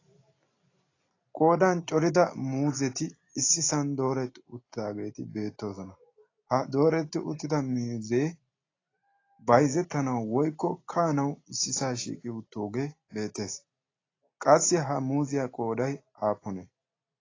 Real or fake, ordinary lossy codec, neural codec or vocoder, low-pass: real; AAC, 32 kbps; none; 7.2 kHz